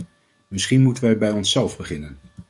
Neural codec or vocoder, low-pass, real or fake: codec, 44.1 kHz, 7.8 kbps, DAC; 10.8 kHz; fake